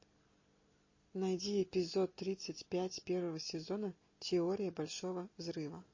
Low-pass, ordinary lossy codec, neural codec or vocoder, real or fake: 7.2 kHz; MP3, 32 kbps; none; real